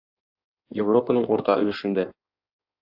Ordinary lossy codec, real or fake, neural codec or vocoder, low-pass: AAC, 48 kbps; fake; codec, 16 kHz in and 24 kHz out, 1.1 kbps, FireRedTTS-2 codec; 5.4 kHz